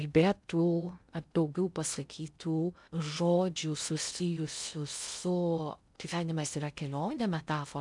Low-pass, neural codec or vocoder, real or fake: 10.8 kHz; codec, 16 kHz in and 24 kHz out, 0.6 kbps, FocalCodec, streaming, 2048 codes; fake